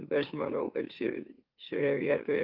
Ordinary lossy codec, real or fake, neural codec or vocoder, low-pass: Opus, 24 kbps; fake; autoencoder, 44.1 kHz, a latent of 192 numbers a frame, MeloTTS; 5.4 kHz